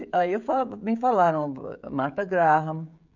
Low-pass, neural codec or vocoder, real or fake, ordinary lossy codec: 7.2 kHz; codec, 16 kHz, 16 kbps, FreqCodec, smaller model; fake; none